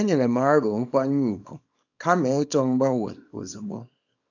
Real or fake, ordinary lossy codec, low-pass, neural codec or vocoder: fake; none; 7.2 kHz; codec, 24 kHz, 0.9 kbps, WavTokenizer, small release